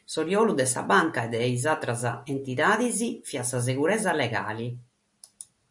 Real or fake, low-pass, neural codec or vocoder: real; 10.8 kHz; none